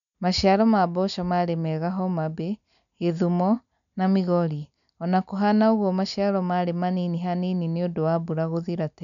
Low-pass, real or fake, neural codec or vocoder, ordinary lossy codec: 7.2 kHz; real; none; none